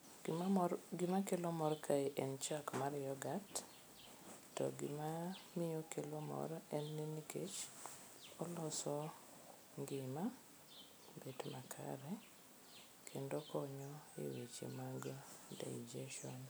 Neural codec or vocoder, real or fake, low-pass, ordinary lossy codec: none; real; none; none